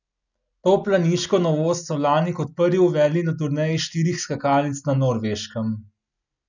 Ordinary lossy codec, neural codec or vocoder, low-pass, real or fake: none; none; 7.2 kHz; real